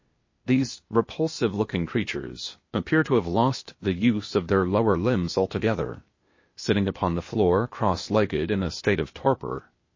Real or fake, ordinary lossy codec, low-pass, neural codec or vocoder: fake; MP3, 32 kbps; 7.2 kHz; codec, 16 kHz, 0.8 kbps, ZipCodec